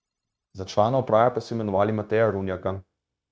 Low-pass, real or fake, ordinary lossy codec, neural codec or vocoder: none; fake; none; codec, 16 kHz, 0.9 kbps, LongCat-Audio-Codec